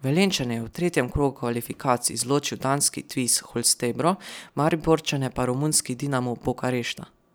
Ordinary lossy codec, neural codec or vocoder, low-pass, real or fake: none; none; none; real